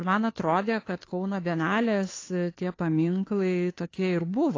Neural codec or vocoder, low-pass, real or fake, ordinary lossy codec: codec, 16 kHz, 2 kbps, FunCodec, trained on Chinese and English, 25 frames a second; 7.2 kHz; fake; AAC, 32 kbps